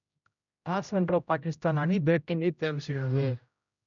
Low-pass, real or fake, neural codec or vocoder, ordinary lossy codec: 7.2 kHz; fake; codec, 16 kHz, 0.5 kbps, X-Codec, HuBERT features, trained on general audio; none